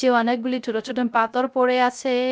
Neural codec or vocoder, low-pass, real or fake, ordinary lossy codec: codec, 16 kHz, 0.3 kbps, FocalCodec; none; fake; none